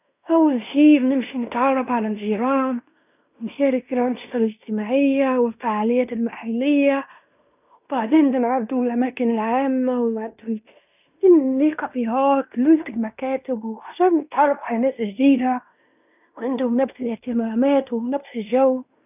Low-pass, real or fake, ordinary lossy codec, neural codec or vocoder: 3.6 kHz; fake; none; codec, 16 kHz in and 24 kHz out, 0.9 kbps, LongCat-Audio-Codec, fine tuned four codebook decoder